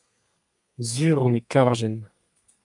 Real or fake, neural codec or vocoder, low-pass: fake; codec, 32 kHz, 1.9 kbps, SNAC; 10.8 kHz